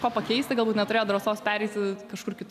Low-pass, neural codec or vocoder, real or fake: 14.4 kHz; none; real